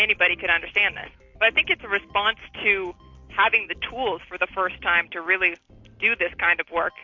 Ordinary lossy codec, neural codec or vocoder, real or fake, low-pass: MP3, 48 kbps; none; real; 7.2 kHz